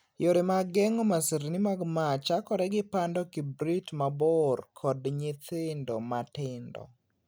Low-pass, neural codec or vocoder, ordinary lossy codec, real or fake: none; none; none; real